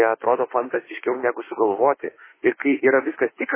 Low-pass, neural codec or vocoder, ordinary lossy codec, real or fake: 3.6 kHz; autoencoder, 48 kHz, 32 numbers a frame, DAC-VAE, trained on Japanese speech; MP3, 16 kbps; fake